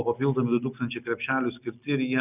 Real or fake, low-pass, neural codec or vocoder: real; 3.6 kHz; none